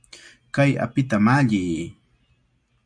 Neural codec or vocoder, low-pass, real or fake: none; 9.9 kHz; real